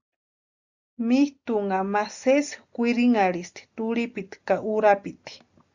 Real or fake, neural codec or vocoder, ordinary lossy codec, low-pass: real; none; Opus, 64 kbps; 7.2 kHz